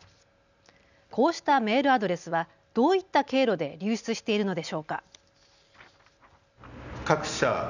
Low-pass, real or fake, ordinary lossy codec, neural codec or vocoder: 7.2 kHz; real; none; none